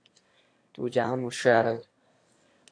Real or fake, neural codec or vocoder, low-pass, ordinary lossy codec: fake; autoencoder, 22.05 kHz, a latent of 192 numbers a frame, VITS, trained on one speaker; 9.9 kHz; AAC, 48 kbps